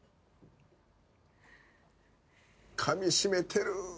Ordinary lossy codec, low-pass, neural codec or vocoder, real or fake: none; none; none; real